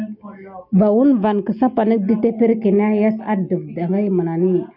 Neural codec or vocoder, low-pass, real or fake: none; 5.4 kHz; real